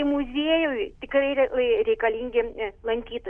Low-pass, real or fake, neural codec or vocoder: 9.9 kHz; real; none